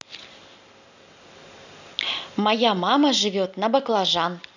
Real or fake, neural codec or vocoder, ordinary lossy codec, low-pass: real; none; none; 7.2 kHz